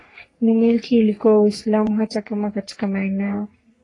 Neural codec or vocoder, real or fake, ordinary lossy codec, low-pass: codec, 44.1 kHz, 3.4 kbps, Pupu-Codec; fake; AAC, 32 kbps; 10.8 kHz